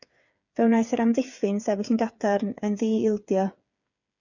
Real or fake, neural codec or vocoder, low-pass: fake; codec, 16 kHz, 8 kbps, FreqCodec, smaller model; 7.2 kHz